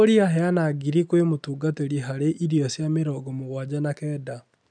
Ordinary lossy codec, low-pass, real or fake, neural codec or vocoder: none; 9.9 kHz; real; none